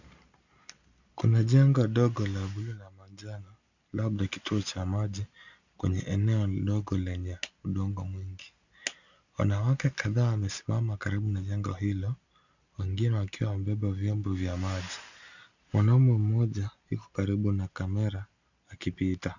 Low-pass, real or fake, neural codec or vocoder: 7.2 kHz; real; none